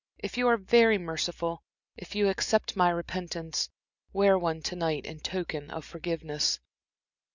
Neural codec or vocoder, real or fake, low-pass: none; real; 7.2 kHz